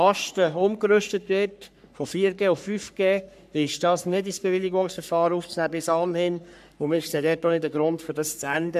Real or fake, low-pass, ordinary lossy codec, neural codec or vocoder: fake; 14.4 kHz; none; codec, 44.1 kHz, 3.4 kbps, Pupu-Codec